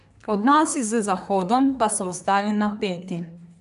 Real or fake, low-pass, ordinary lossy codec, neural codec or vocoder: fake; 10.8 kHz; none; codec, 24 kHz, 1 kbps, SNAC